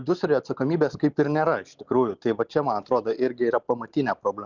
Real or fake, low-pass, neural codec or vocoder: real; 7.2 kHz; none